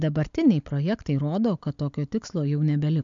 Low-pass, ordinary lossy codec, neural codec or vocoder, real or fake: 7.2 kHz; MP3, 64 kbps; none; real